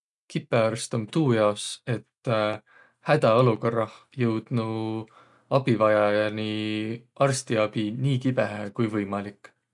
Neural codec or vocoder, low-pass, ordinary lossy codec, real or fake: none; 10.8 kHz; none; real